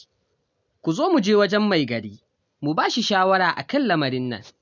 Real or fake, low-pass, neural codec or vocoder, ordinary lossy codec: real; 7.2 kHz; none; none